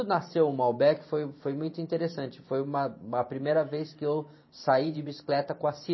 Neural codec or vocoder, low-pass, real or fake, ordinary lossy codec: vocoder, 44.1 kHz, 128 mel bands every 512 samples, BigVGAN v2; 7.2 kHz; fake; MP3, 24 kbps